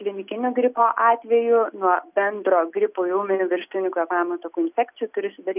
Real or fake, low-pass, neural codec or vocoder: real; 3.6 kHz; none